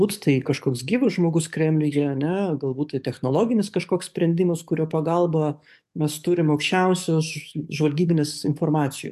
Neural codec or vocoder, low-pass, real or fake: codec, 44.1 kHz, 7.8 kbps, DAC; 14.4 kHz; fake